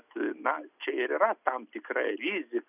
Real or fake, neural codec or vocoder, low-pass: real; none; 3.6 kHz